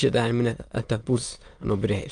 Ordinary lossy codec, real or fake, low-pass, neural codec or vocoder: Opus, 32 kbps; fake; 9.9 kHz; autoencoder, 22.05 kHz, a latent of 192 numbers a frame, VITS, trained on many speakers